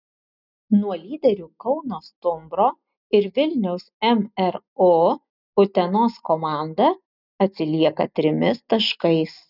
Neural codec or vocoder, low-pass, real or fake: none; 5.4 kHz; real